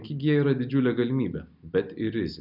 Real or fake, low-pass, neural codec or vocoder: real; 5.4 kHz; none